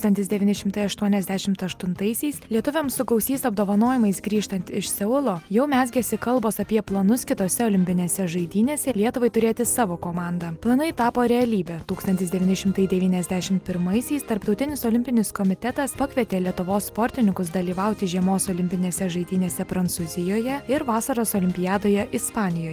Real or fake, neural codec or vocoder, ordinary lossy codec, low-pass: real; none; Opus, 32 kbps; 14.4 kHz